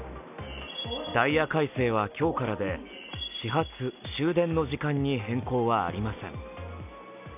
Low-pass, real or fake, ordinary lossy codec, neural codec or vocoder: 3.6 kHz; real; none; none